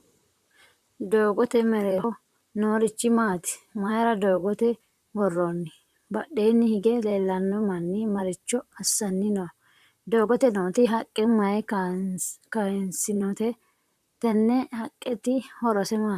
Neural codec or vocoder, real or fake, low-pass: vocoder, 44.1 kHz, 128 mel bands, Pupu-Vocoder; fake; 14.4 kHz